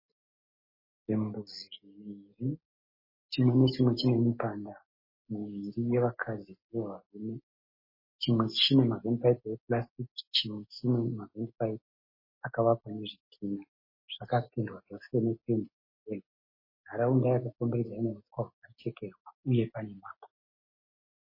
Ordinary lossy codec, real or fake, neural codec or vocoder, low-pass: MP3, 24 kbps; real; none; 5.4 kHz